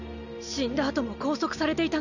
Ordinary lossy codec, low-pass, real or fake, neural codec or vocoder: none; 7.2 kHz; real; none